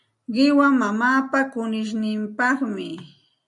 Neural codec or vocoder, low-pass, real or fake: none; 10.8 kHz; real